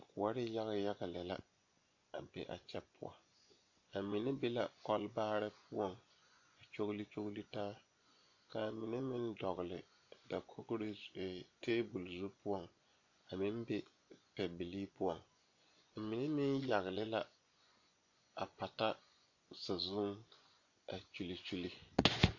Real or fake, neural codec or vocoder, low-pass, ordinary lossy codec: fake; vocoder, 24 kHz, 100 mel bands, Vocos; 7.2 kHz; AAC, 48 kbps